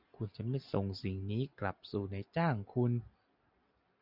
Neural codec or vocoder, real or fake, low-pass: none; real; 5.4 kHz